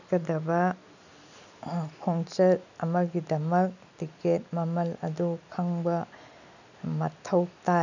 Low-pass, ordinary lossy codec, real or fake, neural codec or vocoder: 7.2 kHz; none; real; none